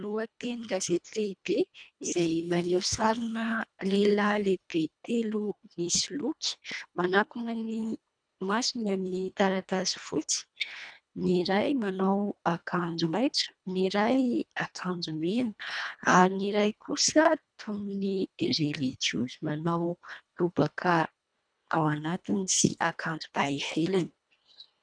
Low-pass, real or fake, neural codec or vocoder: 9.9 kHz; fake; codec, 24 kHz, 1.5 kbps, HILCodec